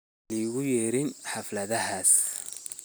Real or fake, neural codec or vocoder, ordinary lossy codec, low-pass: real; none; none; none